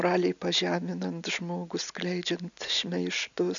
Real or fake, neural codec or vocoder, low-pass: real; none; 7.2 kHz